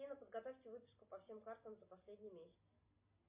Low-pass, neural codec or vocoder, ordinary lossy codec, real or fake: 3.6 kHz; none; MP3, 24 kbps; real